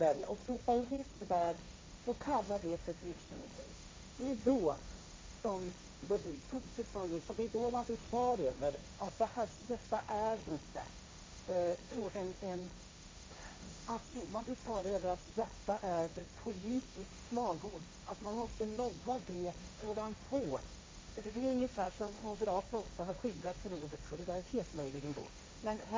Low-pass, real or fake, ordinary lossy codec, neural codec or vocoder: none; fake; none; codec, 16 kHz, 1.1 kbps, Voila-Tokenizer